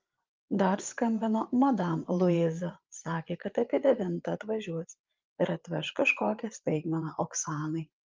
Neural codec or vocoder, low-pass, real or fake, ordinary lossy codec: vocoder, 22.05 kHz, 80 mel bands, Vocos; 7.2 kHz; fake; Opus, 24 kbps